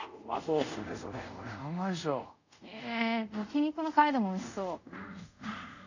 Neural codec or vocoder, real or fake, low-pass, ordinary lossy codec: codec, 24 kHz, 0.5 kbps, DualCodec; fake; 7.2 kHz; none